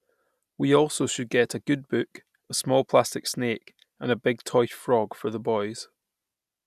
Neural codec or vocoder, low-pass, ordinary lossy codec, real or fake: none; 14.4 kHz; none; real